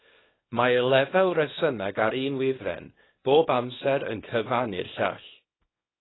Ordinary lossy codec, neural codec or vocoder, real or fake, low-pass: AAC, 16 kbps; codec, 24 kHz, 0.9 kbps, WavTokenizer, small release; fake; 7.2 kHz